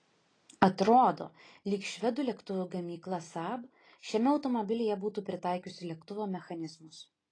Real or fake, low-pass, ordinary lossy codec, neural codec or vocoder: real; 9.9 kHz; AAC, 32 kbps; none